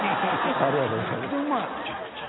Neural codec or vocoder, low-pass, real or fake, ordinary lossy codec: none; 7.2 kHz; real; AAC, 16 kbps